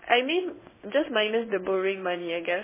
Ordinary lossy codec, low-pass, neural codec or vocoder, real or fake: MP3, 16 kbps; 3.6 kHz; codec, 16 kHz in and 24 kHz out, 1 kbps, XY-Tokenizer; fake